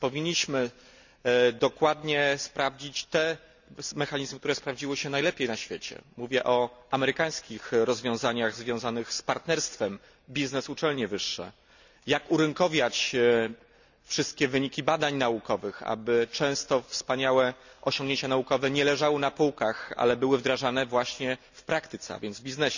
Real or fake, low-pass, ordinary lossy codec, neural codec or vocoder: real; 7.2 kHz; none; none